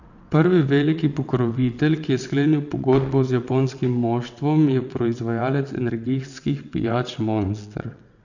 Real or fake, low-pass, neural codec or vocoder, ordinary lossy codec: fake; 7.2 kHz; vocoder, 22.05 kHz, 80 mel bands, WaveNeXt; none